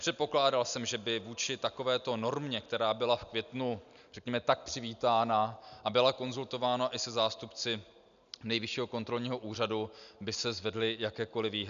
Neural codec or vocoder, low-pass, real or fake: none; 7.2 kHz; real